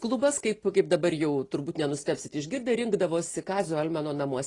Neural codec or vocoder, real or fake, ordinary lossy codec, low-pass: none; real; AAC, 32 kbps; 10.8 kHz